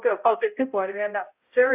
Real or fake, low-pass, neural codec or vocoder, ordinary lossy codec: fake; 3.6 kHz; codec, 16 kHz, 0.5 kbps, X-Codec, HuBERT features, trained on balanced general audio; AAC, 32 kbps